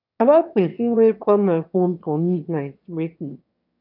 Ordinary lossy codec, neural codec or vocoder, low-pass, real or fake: none; autoencoder, 22.05 kHz, a latent of 192 numbers a frame, VITS, trained on one speaker; 5.4 kHz; fake